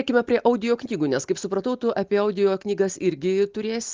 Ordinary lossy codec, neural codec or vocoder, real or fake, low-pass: Opus, 24 kbps; none; real; 7.2 kHz